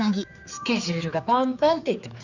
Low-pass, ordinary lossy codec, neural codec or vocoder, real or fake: 7.2 kHz; none; codec, 16 kHz, 4 kbps, X-Codec, HuBERT features, trained on general audio; fake